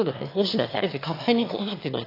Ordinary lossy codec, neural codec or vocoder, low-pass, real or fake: AAC, 32 kbps; autoencoder, 22.05 kHz, a latent of 192 numbers a frame, VITS, trained on one speaker; 5.4 kHz; fake